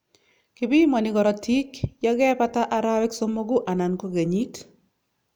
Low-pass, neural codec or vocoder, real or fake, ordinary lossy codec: none; none; real; none